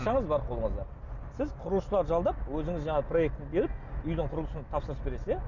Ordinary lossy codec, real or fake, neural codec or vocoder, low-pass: none; real; none; 7.2 kHz